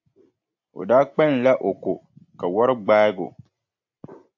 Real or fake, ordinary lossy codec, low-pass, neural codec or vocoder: real; AAC, 48 kbps; 7.2 kHz; none